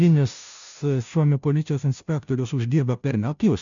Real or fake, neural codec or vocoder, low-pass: fake; codec, 16 kHz, 0.5 kbps, FunCodec, trained on Chinese and English, 25 frames a second; 7.2 kHz